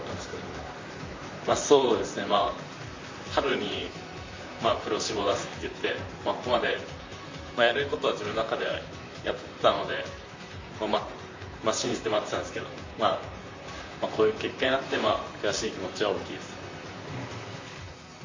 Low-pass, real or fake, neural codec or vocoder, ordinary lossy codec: 7.2 kHz; fake; vocoder, 44.1 kHz, 128 mel bands, Pupu-Vocoder; MP3, 48 kbps